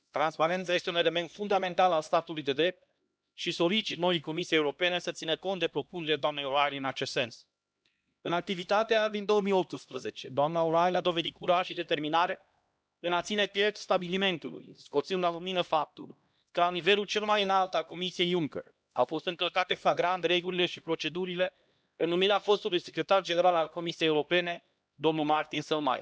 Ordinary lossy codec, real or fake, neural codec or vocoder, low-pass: none; fake; codec, 16 kHz, 1 kbps, X-Codec, HuBERT features, trained on LibriSpeech; none